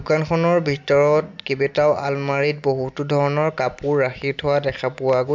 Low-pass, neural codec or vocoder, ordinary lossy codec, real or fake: 7.2 kHz; none; MP3, 64 kbps; real